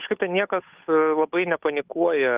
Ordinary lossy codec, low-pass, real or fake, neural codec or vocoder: Opus, 24 kbps; 3.6 kHz; fake; codec, 16 kHz, 8 kbps, FunCodec, trained on Chinese and English, 25 frames a second